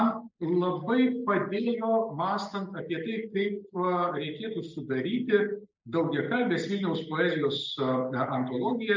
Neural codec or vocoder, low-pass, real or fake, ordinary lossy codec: none; 7.2 kHz; real; MP3, 64 kbps